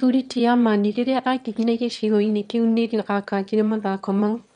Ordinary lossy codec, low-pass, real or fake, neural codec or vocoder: none; 9.9 kHz; fake; autoencoder, 22.05 kHz, a latent of 192 numbers a frame, VITS, trained on one speaker